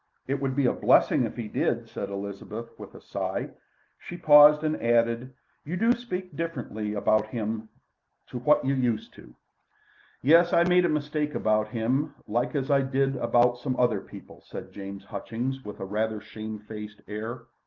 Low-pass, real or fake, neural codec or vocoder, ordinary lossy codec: 7.2 kHz; real; none; Opus, 24 kbps